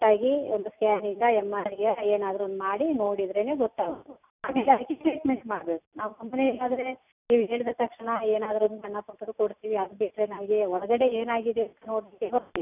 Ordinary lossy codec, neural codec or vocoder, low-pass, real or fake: none; none; 3.6 kHz; real